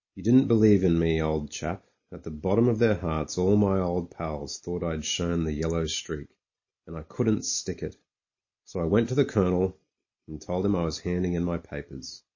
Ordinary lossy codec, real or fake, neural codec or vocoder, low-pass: MP3, 32 kbps; real; none; 7.2 kHz